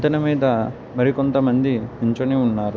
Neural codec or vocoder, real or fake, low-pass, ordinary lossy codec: none; real; none; none